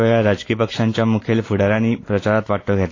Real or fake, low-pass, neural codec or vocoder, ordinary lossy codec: real; 7.2 kHz; none; AAC, 32 kbps